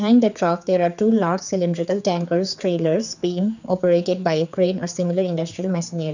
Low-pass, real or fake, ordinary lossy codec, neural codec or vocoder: 7.2 kHz; fake; none; codec, 16 kHz, 4 kbps, X-Codec, HuBERT features, trained on general audio